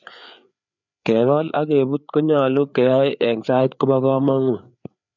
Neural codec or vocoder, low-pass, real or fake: codec, 16 kHz, 4 kbps, FreqCodec, larger model; 7.2 kHz; fake